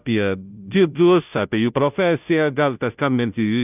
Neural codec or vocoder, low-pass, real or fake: codec, 16 kHz, 0.5 kbps, FunCodec, trained on Chinese and English, 25 frames a second; 3.6 kHz; fake